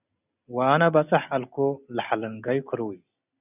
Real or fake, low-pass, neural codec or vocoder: real; 3.6 kHz; none